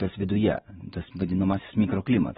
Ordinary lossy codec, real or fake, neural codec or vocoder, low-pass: AAC, 16 kbps; real; none; 19.8 kHz